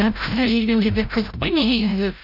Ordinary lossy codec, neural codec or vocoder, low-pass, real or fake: none; codec, 16 kHz, 0.5 kbps, FreqCodec, larger model; 5.4 kHz; fake